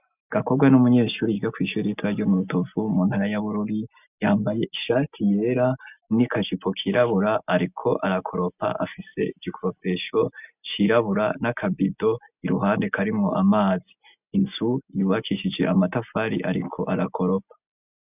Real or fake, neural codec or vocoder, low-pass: real; none; 3.6 kHz